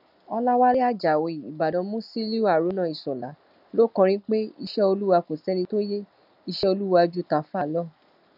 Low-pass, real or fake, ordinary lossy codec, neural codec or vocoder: 5.4 kHz; real; none; none